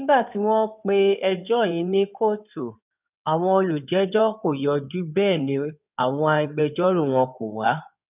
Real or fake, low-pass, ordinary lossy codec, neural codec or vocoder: fake; 3.6 kHz; none; codec, 16 kHz in and 24 kHz out, 2.2 kbps, FireRedTTS-2 codec